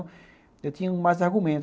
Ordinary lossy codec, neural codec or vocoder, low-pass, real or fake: none; none; none; real